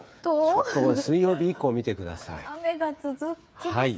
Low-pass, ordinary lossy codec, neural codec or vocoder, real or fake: none; none; codec, 16 kHz, 8 kbps, FreqCodec, smaller model; fake